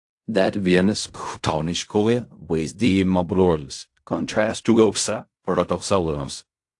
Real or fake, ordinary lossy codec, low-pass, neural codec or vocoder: fake; AAC, 64 kbps; 10.8 kHz; codec, 16 kHz in and 24 kHz out, 0.4 kbps, LongCat-Audio-Codec, fine tuned four codebook decoder